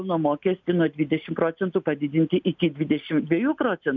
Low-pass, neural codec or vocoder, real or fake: 7.2 kHz; none; real